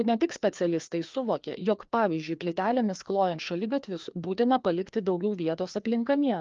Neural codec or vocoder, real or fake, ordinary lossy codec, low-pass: codec, 16 kHz, 2 kbps, FreqCodec, larger model; fake; Opus, 24 kbps; 7.2 kHz